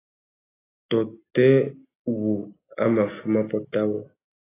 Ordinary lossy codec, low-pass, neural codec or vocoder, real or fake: AAC, 16 kbps; 3.6 kHz; none; real